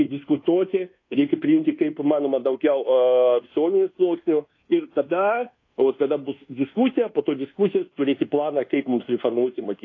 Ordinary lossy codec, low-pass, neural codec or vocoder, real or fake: AAC, 32 kbps; 7.2 kHz; codec, 24 kHz, 1.2 kbps, DualCodec; fake